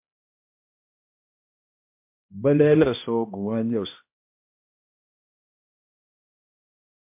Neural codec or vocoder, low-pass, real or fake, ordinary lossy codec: codec, 16 kHz, 1 kbps, X-Codec, HuBERT features, trained on balanced general audio; 3.6 kHz; fake; MP3, 32 kbps